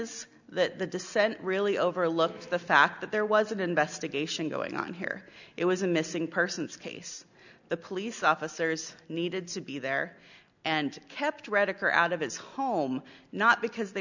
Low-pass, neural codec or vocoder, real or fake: 7.2 kHz; none; real